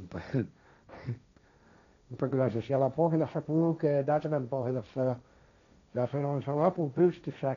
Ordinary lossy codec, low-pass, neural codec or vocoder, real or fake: none; 7.2 kHz; codec, 16 kHz, 1.1 kbps, Voila-Tokenizer; fake